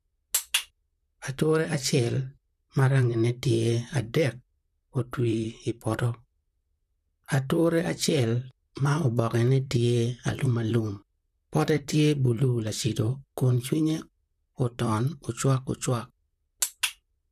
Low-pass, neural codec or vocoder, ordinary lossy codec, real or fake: 14.4 kHz; vocoder, 44.1 kHz, 128 mel bands, Pupu-Vocoder; none; fake